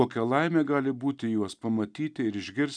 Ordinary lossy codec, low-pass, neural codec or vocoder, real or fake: MP3, 96 kbps; 9.9 kHz; none; real